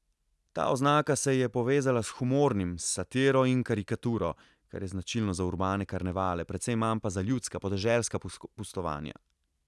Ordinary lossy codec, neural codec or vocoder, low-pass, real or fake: none; none; none; real